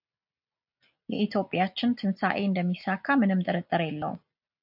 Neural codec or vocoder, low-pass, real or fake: none; 5.4 kHz; real